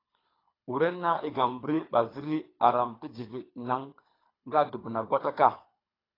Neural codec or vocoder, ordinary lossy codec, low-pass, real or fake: codec, 24 kHz, 3 kbps, HILCodec; AAC, 24 kbps; 5.4 kHz; fake